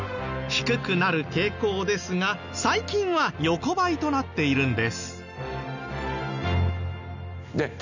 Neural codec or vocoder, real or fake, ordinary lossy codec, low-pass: none; real; none; 7.2 kHz